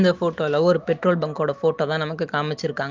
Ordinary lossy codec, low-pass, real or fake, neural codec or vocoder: Opus, 32 kbps; 7.2 kHz; real; none